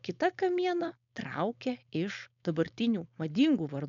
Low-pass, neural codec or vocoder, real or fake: 7.2 kHz; codec, 16 kHz, 4.8 kbps, FACodec; fake